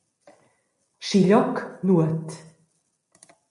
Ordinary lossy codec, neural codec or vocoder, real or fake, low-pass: MP3, 48 kbps; none; real; 14.4 kHz